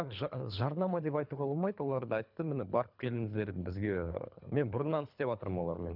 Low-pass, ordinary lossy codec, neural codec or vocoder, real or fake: 5.4 kHz; none; codec, 24 kHz, 3 kbps, HILCodec; fake